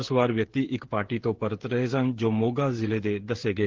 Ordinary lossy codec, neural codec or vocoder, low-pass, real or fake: Opus, 16 kbps; codec, 16 kHz, 16 kbps, FreqCodec, smaller model; 7.2 kHz; fake